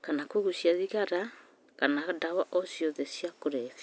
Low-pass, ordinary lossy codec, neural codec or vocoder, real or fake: none; none; none; real